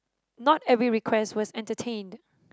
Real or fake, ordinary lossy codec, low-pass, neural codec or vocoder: real; none; none; none